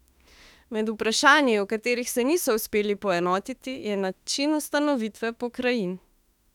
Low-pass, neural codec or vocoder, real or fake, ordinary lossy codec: 19.8 kHz; autoencoder, 48 kHz, 32 numbers a frame, DAC-VAE, trained on Japanese speech; fake; none